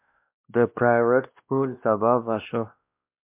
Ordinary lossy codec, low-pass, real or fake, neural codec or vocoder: MP3, 32 kbps; 3.6 kHz; fake; codec, 16 kHz, 1 kbps, X-Codec, WavLM features, trained on Multilingual LibriSpeech